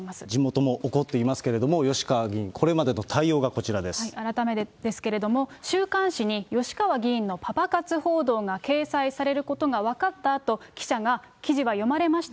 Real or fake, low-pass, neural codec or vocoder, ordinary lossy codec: real; none; none; none